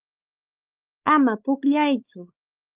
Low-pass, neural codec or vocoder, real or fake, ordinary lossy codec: 3.6 kHz; codec, 16 kHz, 4 kbps, X-Codec, WavLM features, trained on Multilingual LibriSpeech; fake; Opus, 32 kbps